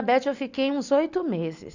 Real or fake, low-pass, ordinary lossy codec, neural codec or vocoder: real; 7.2 kHz; none; none